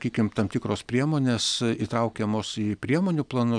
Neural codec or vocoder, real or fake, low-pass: none; real; 9.9 kHz